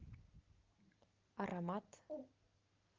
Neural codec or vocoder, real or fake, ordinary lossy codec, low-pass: none; real; Opus, 32 kbps; 7.2 kHz